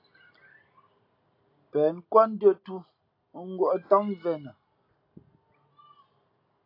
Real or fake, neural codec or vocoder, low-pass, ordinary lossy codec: real; none; 5.4 kHz; AAC, 32 kbps